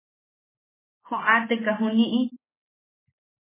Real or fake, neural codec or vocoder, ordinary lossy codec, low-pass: real; none; MP3, 16 kbps; 3.6 kHz